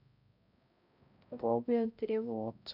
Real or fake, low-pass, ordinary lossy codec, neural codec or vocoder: fake; 5.4 kHz; none; codec, 16 kHz, 0.5 kbps, X-Codec, HuBERT features, trained on balanced general audio